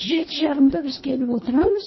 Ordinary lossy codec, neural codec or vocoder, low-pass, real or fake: MP3, 24 kbps; codec, 24 kHz, 1.5 kbps, HILCodec; 7.2 kHz; fake